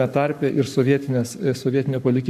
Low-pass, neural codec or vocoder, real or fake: 14.4 kHz; codec, 44.1 kHz, 7.8 kbps, Pupu-Codec; fake